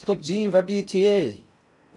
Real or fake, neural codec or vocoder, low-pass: fake; codec, 16 kHz in and 24 kHz out, 0.6 kbps, FocalCodec, streaming, 4096 codes; 10.8 kHz